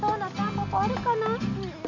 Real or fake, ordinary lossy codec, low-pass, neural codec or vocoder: real; none; 7.2 kHz; none